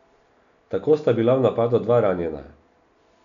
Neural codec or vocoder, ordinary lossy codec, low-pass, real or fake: none; none; 7.2 kHz; real